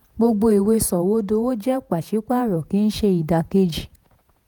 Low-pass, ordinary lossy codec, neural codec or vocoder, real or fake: none; none; vocoder, 48 kHz, 128 mel bands, Vocos; fake